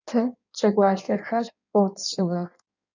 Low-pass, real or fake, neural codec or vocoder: 7.2 kHz; fake; codec, 16 kHz in and 24 kHz out, 1.1 kbps, FireRedTTS-2 codec